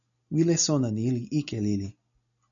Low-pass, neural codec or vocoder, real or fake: 7.2 kHz; none; real